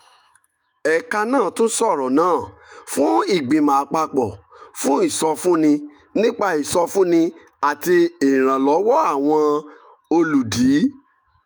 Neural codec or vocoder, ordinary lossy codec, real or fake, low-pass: autoencoder, 48 kHz, 128 numbers a frame, DAC-VAE, trained on Japanese speech; none; fake; none